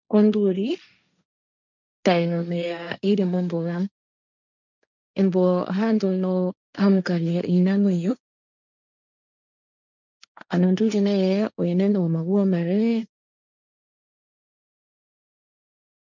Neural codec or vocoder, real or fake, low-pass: codec, 16 kHz, 1.1 kbps, Voila-Tokenizer; fake; 7.2 kHz